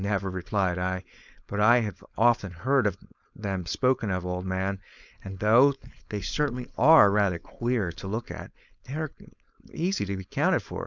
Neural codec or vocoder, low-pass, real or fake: codec, 16 kHz, 4.8 kbps, FACodec; 7.2 kHz; fake